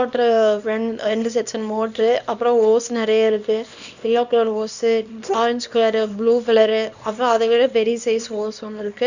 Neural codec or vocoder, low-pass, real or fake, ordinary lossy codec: codec, 24 kHz, 0.9 kbps, WavTokenizer, small release; 7.2 kHz; fake; none